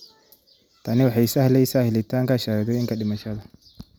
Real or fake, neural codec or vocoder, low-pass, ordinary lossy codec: real; none; none; none